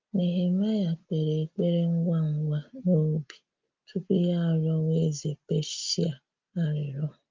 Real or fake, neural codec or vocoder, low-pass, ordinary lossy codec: real; none; 7.2 kHz; Opus, 24 kbps